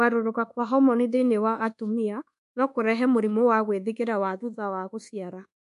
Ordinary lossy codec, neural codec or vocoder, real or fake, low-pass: MP3, 64 kbps; codec, 24 kHz, 1.2 kbps, DualCodec; fake; 10.8 kHz